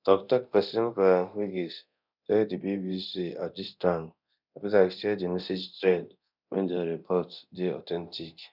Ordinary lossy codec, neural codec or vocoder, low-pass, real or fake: none; codec, 24 kHz, 0.9 kbps, DualCodec; 5.4 kHz; fake